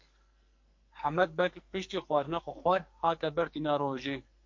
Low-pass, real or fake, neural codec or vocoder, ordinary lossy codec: 7.2 kHz; fake; codec, 44.1 kHz, 2.6 kbps, SNAC; MP3, 48 kbps